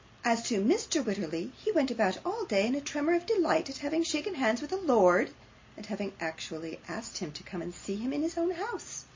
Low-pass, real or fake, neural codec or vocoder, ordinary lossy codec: 7.2 kHz; real; none; MP3, 32 kbps